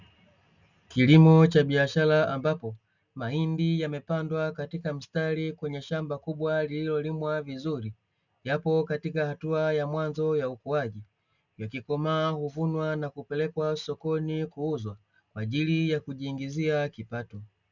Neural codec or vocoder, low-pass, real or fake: none; 7.2 kHz; real